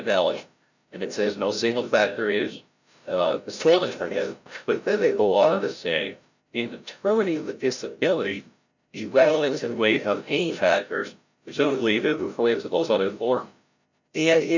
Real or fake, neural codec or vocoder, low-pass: fake; codec, 16 kHz, 0.5 kbps, FreqCodec, larger model; 7.2 kHz